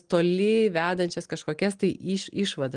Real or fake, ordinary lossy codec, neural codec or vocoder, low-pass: real; Opus, 24 kbps; none; 9.9 kHz